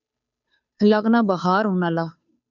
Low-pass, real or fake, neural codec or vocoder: 7.2 kHz; fake; codec, 16 kHz, 2 kbps, FunCodec, trained on Chinese and English, 25 frames a second